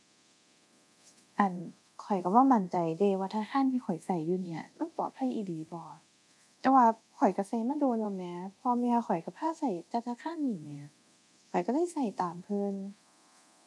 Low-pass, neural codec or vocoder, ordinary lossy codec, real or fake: none; codec, 24 kHz, 0.9 kbps, DualCodec; none; fake